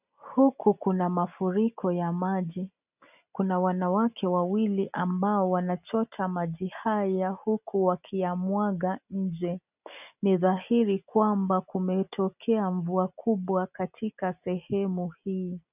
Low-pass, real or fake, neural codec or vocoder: 3.6 kHz; real; none